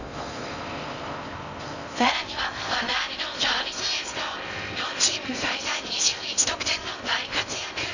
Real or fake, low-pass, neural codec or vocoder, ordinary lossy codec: fake; 7.2 kHz; codec, 16 kHz in and 24 kHz out, 0.6 kbps, FocalCodec, streaming, 4096 codes; none